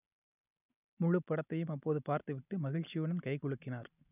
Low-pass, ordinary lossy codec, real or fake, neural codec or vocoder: 3.6 kHz; none; real; none